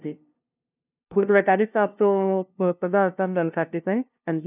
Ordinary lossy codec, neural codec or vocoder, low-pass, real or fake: none; codec, 16 kHz, 0.5 kbps, FunCodec, trained on LibriTTS, 25 frames a second; 3.6 kHz; fake